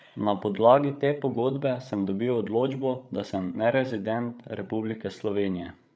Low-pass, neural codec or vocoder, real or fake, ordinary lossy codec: none; codec, 16 kHz, 8 kbps, FreqCodec, larger model; fake; none